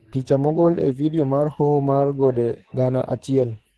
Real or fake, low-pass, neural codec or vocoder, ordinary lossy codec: fake; 10.8 kHz; codec, 44.1 kHz, 2.6 kbps, SNAC; Opus, 16 kbps